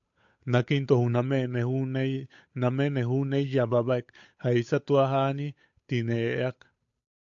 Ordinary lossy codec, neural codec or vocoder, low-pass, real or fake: AAC, 64 kbps; codec, 16 kHz, 8 kbps, FunCodec, trained on Chinese and English, 25 frames a second; 7.2 kHz; fake